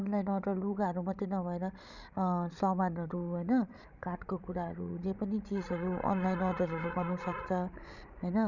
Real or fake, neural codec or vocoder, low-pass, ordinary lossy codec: fake; codec, 16 kHz, 16 kbps, FreqCodec, larger model; 7.2 kHz; none